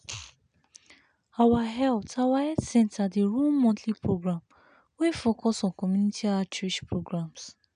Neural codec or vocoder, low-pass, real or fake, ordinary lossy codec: none; 9.9 kHz; real; none